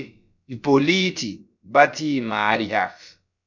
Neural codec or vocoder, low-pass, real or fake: codec, 16 kHz, about 1 kbps, DyCAST, with the encoder's durations; 7.2 kHz; fake